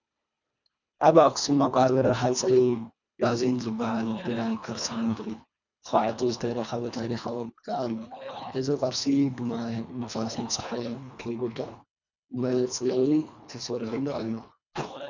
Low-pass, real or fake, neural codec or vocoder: 7.2 kHz; fake; codec, 24 kHz, 1.5 kbps, HILCodec